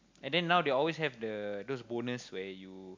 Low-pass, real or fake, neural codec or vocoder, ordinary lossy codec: 7.2 kHz; real; none; MP3, 64 kbps